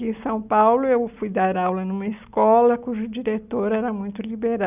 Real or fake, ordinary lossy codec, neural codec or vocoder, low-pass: real; none; none; 3.6 kHz